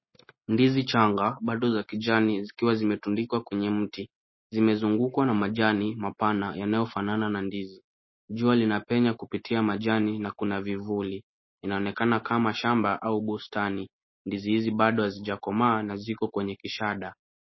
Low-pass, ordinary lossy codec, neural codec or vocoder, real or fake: 7.2 kHz; MP3, 24 kbps; none; real